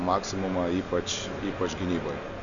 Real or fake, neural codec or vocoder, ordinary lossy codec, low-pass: real; none; MP3, 96 kbps; 7.2 kHz